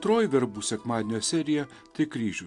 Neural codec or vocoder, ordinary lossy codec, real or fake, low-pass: none; MP3, 64 kbps; real; 10.8 kHz